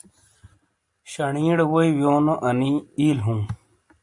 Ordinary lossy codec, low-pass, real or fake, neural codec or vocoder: MP3, 48 kbps; 10.8 kHz; real; none